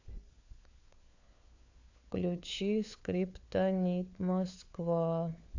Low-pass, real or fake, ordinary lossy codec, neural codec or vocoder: 7.2 kHz; fake; AAC, 48 kbps; codec, 16 kHz, 4 kbps, FunCodec, trained on LibriTTS, 50 frames a second